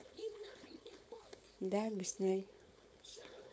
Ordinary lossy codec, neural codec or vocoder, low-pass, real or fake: none; codec, 16 kHz, 4.8 kbps, FACodec; none; fake